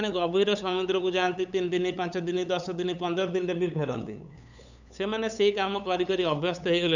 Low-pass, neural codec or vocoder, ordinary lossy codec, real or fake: 7.2 kHz; codec, 16 kHz, 8 kbps, FunCodec, trained on LibriTTS, 25 frames a second; none; fake